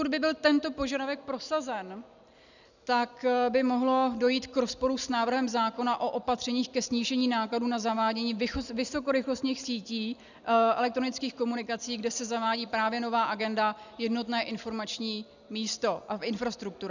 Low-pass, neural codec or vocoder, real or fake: 7.2 kHz; none; real